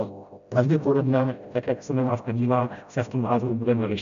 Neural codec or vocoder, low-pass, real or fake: codec, 16 kHz, 0.5 kbps, FreqCodec, smaller model; 7.2 kHz; fake